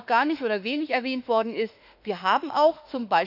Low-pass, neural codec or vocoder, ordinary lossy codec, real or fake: 5.4 kHz; autoencoder, 48 kHz, 32 numbers a frame, DAC-VAE, trained on Japanese speech; none; fake